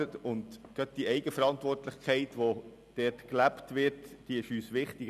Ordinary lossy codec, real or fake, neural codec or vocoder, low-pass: none; real; none; 14.4 kHz